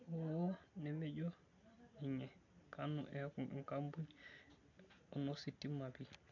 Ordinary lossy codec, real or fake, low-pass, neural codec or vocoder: none; real; 7.2 kHz; none